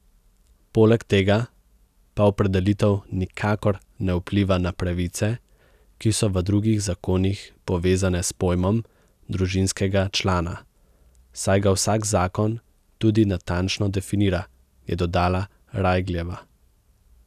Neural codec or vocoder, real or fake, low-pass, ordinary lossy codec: none; real; 14.4 kHz; none